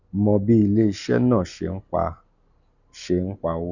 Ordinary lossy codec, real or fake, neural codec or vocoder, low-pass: none; real; none; 7.2 kHz